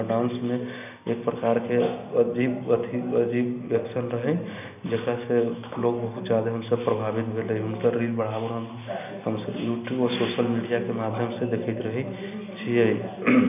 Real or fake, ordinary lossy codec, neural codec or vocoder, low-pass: real; none; none; 3.6 kHz